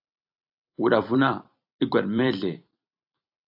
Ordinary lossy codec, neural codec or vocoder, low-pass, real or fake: AAC, 48 kbps; vocoder, 44.1 kHz, 128 mel bands every 256 samples, BigVGAN v2; 5.4 kHz; fake